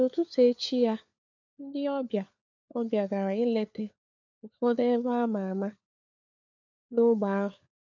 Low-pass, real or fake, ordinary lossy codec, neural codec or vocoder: 7.2 kHz; fake; none; codec, 16 kHz, 4 kbps, FunCodec, trained on LibriTTS, 50 frames a second